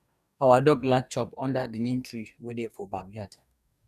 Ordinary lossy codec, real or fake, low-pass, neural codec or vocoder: none; fake; 14.4 kHz; codec, 44.1 kHz, 2.6 kbps, DAC